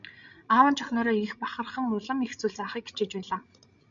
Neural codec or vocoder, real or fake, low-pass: codec, 16 kHz, 16 kbps, FreqCodec, larger model; fake; 7.2 kHz